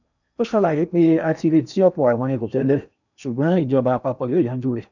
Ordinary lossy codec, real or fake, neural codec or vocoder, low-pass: none; fake; codec, 16 kHz in and 24 kHz out, 0.8 kbps, FocalCodec, streaming, 65536 codes; 7.2 kHz